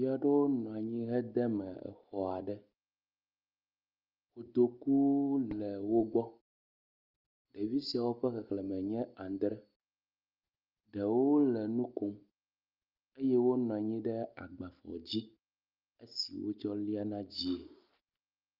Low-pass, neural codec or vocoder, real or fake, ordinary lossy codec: 5.4 kHz; none; real; Opus, 24 kbps